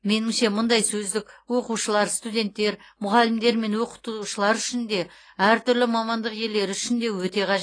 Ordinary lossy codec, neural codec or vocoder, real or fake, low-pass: AAC, 32 kbps; none; real; 9.9 kHz